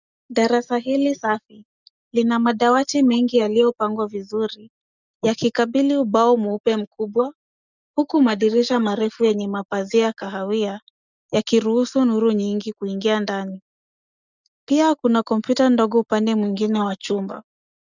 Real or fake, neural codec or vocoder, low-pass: real; none; 7.2 kHz